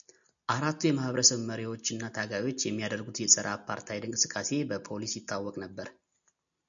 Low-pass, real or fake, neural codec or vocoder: 7.2 kHz; real; none